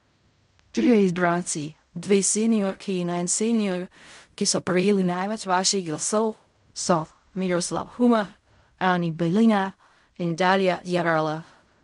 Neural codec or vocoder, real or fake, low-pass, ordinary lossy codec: codec, 16 kHz in and 24 kHz out, 0.4 kbps, LongCat-Audio-Codec, fine tuned four codebook decoder; fake; 10.8 kHz; MP3, 64 kbps